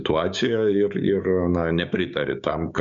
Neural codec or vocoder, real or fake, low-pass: codec, 16 kHz, 6 kbps, DAC; fake; 7.2 kHz